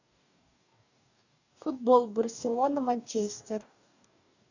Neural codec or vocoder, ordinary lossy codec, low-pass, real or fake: codec, 44.1 kHz, 2.6 kbps, DAC; none; 7.2 kHz; fake